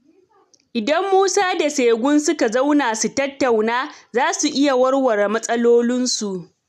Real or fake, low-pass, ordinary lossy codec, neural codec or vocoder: real; 14.4 kHz; none; none